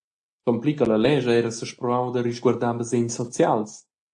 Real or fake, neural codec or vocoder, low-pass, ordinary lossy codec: real; none; 9.9 kHz; AAC, 64 kbps